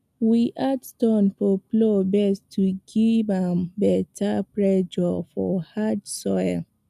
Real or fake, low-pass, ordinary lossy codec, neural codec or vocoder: real; 14.4 kHz; none; none